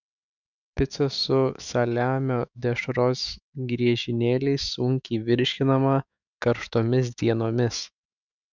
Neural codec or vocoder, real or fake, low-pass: autoencoder, 48 kHz, 128 numbers a frame, DAC-VAE, trained on Japanese speech; fake; 7.2 kHz